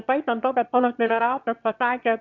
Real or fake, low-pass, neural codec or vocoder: fake; 7.2 kHz; autoencoder, 22.05 kHz, a latent of 192 numbers a frame, VITS, trained on one speaker